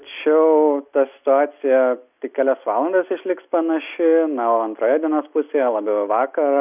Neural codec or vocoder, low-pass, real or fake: none; 3.6 kHz; real